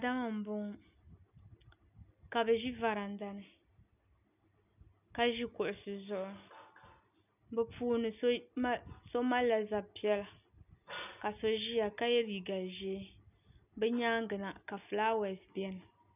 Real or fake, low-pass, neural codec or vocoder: real; 3.6 kHz; none